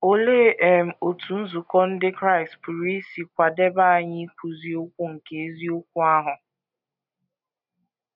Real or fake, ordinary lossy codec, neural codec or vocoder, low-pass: real; none; none; 5.4 kHz